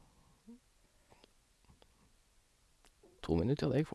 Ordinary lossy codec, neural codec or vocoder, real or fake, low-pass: none; vocoder, 24 kHz, 100 mel bands, Vocos; fake; none